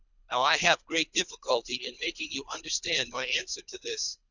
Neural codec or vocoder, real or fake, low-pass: codec, 24 kHz, 3 kbps, HILCodec; fake; 7.2 kHz